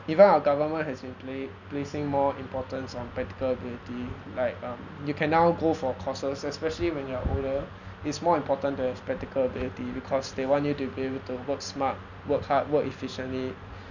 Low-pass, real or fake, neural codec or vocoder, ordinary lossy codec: 7.2 kHz; real; none; none